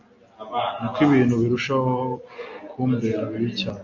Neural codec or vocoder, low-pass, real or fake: none; 7.2 kHz; real